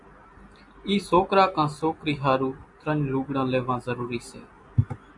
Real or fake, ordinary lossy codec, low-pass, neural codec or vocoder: real; AAC, 64 kbps; 10.8 kHz; none